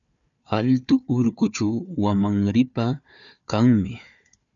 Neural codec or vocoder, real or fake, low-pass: codec, 16 kHz, 4 kbps, FunCodec, trained on Chinese and English, 50 frames a second; fake; 7.2 kHz